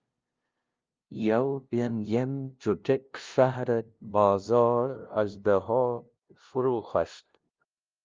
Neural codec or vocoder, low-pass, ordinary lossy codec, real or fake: codec, 16 kHz, 0.5 kbps, FunCodec, trained on LibriTTS, 25 frames a second; 7.2 kHz; Opus, 24 kbps; fake